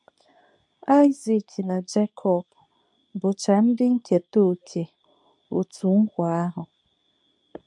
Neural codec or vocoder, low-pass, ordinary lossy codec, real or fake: codec, 24 kHz, 0.9 kbps, WavTokenizer, medium speech release version 2; 10.8 kHz; MP3, 96 kbps; fake